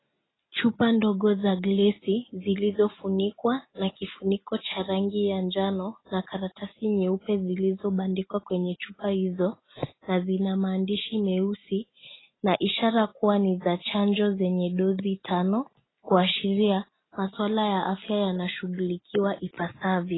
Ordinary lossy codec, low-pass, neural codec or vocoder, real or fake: AAC, 16 kbps; 7.2 kHz; none; real